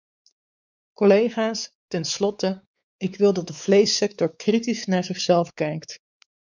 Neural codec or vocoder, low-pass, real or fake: codec, 16 kHz, 4 kbps, X-Codec, WavLM features, trained on Multilingual LibriSpeech; 7.2 kHz; fake